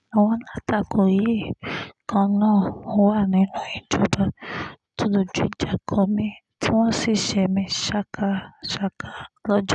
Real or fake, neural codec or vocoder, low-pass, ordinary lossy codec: real; none; 10.8 kHz; none